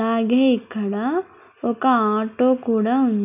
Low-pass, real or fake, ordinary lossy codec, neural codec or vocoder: 3.6 kHz; real; none; none